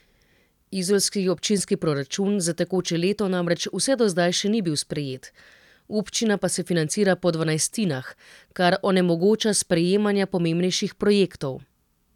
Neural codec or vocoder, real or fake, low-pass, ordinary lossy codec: none; real; 19.8 kHz; none